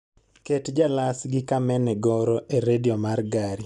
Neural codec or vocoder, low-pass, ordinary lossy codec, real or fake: none; 10.8 kHz; none; real